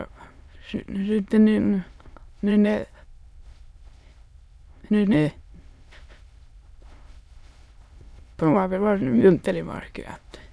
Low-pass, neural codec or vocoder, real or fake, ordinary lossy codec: none; autoencoder, 22.05 kHz, a latent of 192 numbers a frame, VITS, trained on many speakers; fake; none